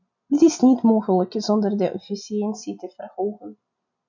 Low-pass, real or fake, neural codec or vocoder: 7.2 kHz; real; none